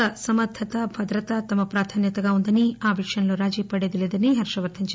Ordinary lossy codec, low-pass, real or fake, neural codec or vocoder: none; none; real; none